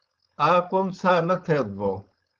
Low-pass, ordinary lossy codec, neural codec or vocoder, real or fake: 7.2 kHz; Opus, 32 kbps; codec, 16 kHz, 4.8 kbps, FACodec; fake